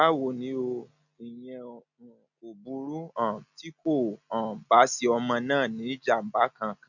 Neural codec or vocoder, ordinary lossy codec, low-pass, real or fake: none; none; 7.2 kHz; real